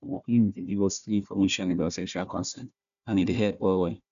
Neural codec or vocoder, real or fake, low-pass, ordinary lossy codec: codec, 16 kHz, 1 kbps, FunCodec, trained on Chinese and English, 50 frames a second; fake; 7.2 kHz; none